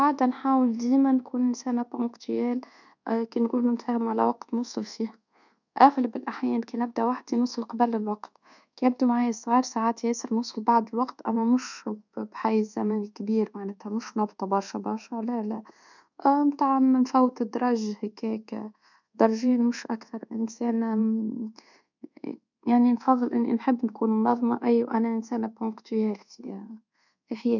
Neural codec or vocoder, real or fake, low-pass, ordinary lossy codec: codec, 24 kHz, 1.2 kbps, DualCodec; fake; 7.2 kHz; none